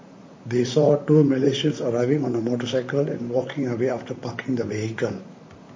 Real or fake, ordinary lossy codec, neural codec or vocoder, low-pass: fake; MP3, 32 kbps; vocoder, 44.1 kHz, 128 mel bands, Pupu-Vocoder; 7.2 kHz